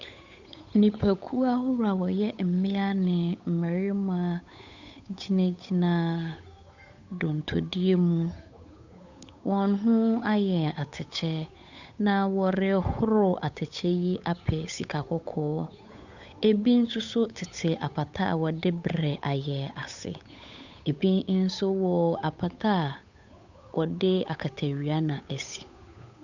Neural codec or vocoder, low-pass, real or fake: codec, 16 kHz, 8 kbps, FunCodec, trained on Chinese and English, 25 frames a second; 7.2 kHz; fake